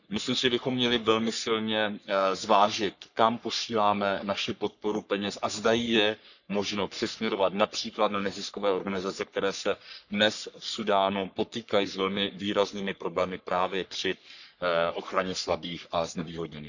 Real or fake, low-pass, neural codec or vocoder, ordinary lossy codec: fake; 7.2 kHz; codec, 44.1 kHz, 3.4 kbps, Pupu-Codec; none